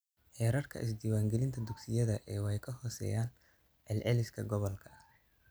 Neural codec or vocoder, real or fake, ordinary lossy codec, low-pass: none; real; none; none